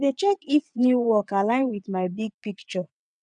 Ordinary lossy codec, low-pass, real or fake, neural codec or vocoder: none; 9.9 kHz; fake; vocoder, 22.05 kHz, 80 mel bands, WaveNeXt